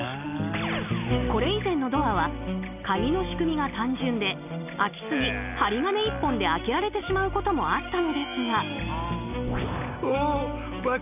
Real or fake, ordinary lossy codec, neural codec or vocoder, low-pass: real; none; none; 3.6 kHz